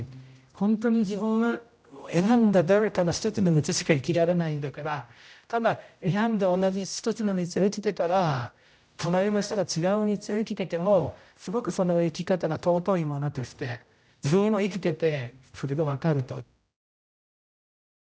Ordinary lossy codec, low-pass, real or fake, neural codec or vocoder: none; none; fake; codec, 16 kHz, 0.5 kbps, X-Codec, HuBERT features, trained on general audio